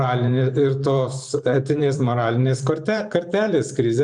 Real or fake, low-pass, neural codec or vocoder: fake; 10.8 kHz; vocoder, 44.1 kHz, 128 mel bands every 512 samples, BigVGAN v2